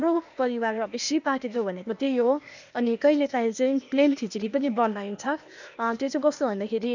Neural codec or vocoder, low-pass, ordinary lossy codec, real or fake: codec, 16 kHz, 0.8 kbps, ZipCodec; 7.2 kHz; none; fake